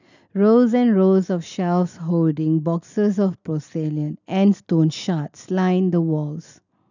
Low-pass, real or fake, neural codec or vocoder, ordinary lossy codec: 7.2 kHz; real; none; none